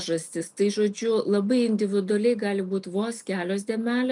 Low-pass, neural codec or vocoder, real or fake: 10.8 kHz; none; real